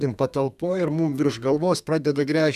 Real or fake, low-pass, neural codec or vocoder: fake; 14.4 kHz; codec, 32 kHz, 1.9 kbps, SNAC